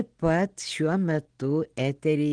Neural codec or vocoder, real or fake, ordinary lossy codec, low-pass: none; real; Opus, 16 kbps; 9.9 kHz